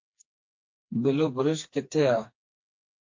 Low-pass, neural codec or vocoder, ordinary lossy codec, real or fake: 7.2 kHz; codec, 16 kHz, 2 kbps, FreqCodec, smaller model; MP3, 48 kbps; fake